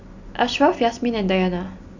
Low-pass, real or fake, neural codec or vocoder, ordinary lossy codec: 7.2 kHz; real; none; none